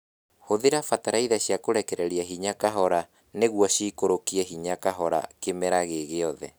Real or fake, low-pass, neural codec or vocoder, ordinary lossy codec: real; none; none; none